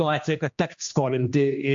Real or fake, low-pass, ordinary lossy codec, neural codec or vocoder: fake; 7.2 kHz; MP3, 48 kbps; codec, 16 kHz, 2 kbps, X-Codec, HuBERT features, trained on general audio